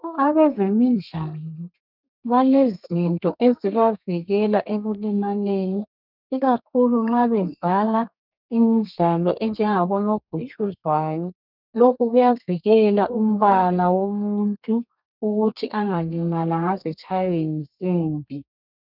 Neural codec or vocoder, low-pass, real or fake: codec, 32 kHz, 1.9 kbps, SNAC; 5.4 kHz; fake